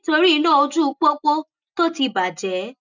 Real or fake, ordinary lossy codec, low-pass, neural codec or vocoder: real; none; 7.2 kHz; none